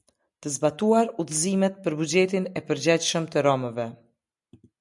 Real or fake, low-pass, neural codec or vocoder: real; 10.8 kHz; none